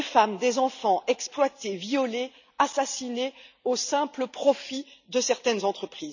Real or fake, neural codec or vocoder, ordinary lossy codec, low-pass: real; none; none; 7.2 kHz